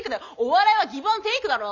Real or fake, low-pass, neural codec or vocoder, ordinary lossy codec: real; 7.2 kHz; none; none